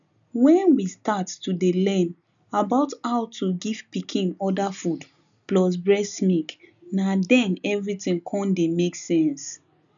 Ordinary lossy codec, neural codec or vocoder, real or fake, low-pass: none; none; real; 7.2 kHz